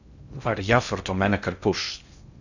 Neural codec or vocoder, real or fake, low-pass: codec, 16 kHz in and 24 kHz out, 0.6 kbps, FocalCodec, streaming, 2048 codes; fake; 7.2 kHz